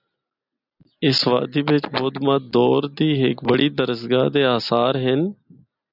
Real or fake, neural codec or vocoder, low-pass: real; none; 5.4 kHz